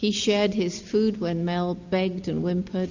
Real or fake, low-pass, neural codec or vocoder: real; 7.2 kHz; none